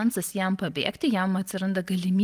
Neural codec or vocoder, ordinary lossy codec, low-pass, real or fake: none; Opus, 24 kbps; 14.4 kHz; real